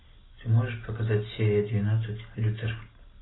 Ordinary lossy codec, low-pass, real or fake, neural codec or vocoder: AAC, 16 kbps; 7.2 kHz; real; none